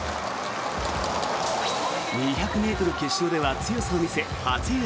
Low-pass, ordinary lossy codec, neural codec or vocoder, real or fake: none; none; none; real